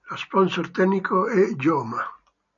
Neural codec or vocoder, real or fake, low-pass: none; real; 7.2 kHz